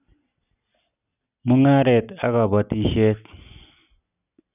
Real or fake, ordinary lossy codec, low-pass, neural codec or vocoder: real; none; 3.6 kHz; none